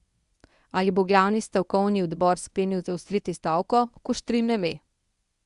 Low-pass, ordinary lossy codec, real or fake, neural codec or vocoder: 10.8 kHz; Opus, 64 kbps; fake; codec, 24 kHz, 0.9 kbps, WavTokenizer, medium speech release version 1